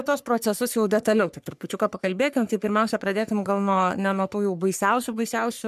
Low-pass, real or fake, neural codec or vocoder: 14.4 kHz; fake; codec, 44.1 kHz, 3.4 kbps, Pupu-Codec